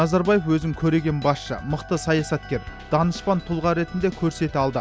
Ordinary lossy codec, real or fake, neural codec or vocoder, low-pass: none; real; none; none